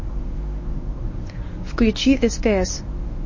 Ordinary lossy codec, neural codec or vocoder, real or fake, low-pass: MP3, 32 kbps; codec, 24 kHz, 0.9 kbps, WavTokenizer, medium speech release version 1; fake; 7.2 kHz